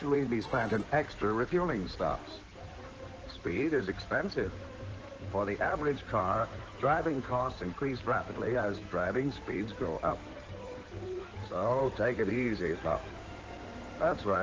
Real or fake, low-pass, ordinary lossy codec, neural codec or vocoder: fake; 7.2 kHz; Opus, 16 kbps; codec, 16 kHz in and 24 kHz out, 2.2 kbps, FireRedTTS-2 codec